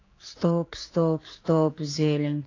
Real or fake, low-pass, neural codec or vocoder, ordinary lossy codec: fake; 7.2 kHz; codec, 16 kHz, 4 kbps, X-Codec, HuBERT features, trained on general audio; AAC, 32 kbps